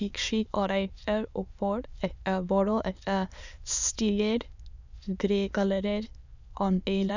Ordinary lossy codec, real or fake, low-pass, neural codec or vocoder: none; fake; 7.2 kHz; autoencoder, 22.05 kHz, a latent of 192 numbers a frame, VITS, trained on many speakers